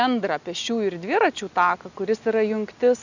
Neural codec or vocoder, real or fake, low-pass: none; real; 7.2 kHz